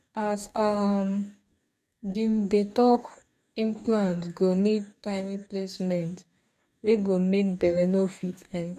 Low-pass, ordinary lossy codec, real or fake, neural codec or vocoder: 14.4 kHz; none; fake; codec, 44.1 kHz, 2.6 kbps, DAC